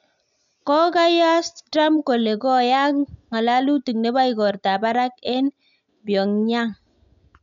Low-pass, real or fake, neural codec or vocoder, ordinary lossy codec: 7.2 kHz; real; none; none